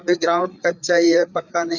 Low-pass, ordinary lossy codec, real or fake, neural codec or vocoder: 7.2 kHz; none; fake; codec, 16 kHz, 4 kbps, FreqCodec, larger model